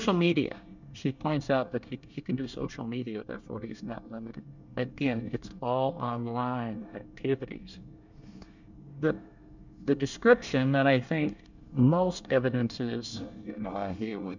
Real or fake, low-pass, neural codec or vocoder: fake; 7.2 kHz; codec, 24 kHz, 1 kbps, SNAC